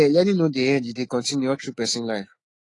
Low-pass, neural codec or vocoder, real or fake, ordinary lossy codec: 10.8 kHz; autoencoder, 48 kHz, 128 numbers a frame, DAC-VAE, trained on Japanese speech; fake; AAC, 32 kbps